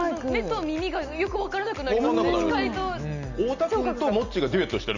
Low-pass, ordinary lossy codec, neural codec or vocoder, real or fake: 7.2 kHz; none; none; real